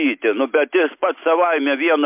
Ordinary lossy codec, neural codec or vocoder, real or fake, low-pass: MP3, 32 kbps; none; real; 3.6 kHz